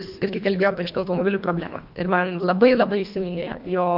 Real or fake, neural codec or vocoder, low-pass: fake; codec, 24 kHz, 1.5 kbps, HILCodec; 5.4 kHz